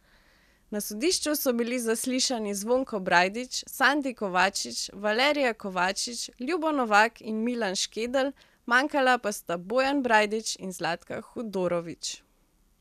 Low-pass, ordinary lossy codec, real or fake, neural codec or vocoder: 14.4 kHz; none; real; none